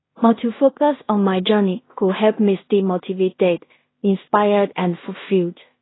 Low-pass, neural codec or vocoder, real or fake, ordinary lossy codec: 7.2 kHz; codec, 16 kHz in and 24 kHz out, 0.4 kbps, LongCat-Audio-Codec, two codebook decoder; fake; AAC, 16 kbps